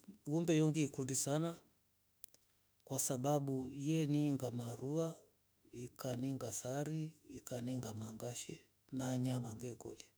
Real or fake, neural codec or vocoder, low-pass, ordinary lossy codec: fake; autoencoder, 48 kHz, 32 numbers a frame, DAC-VAE, trained on Japanese speech; none; none